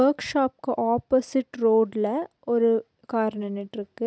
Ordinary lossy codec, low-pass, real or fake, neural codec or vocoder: none; none; real; none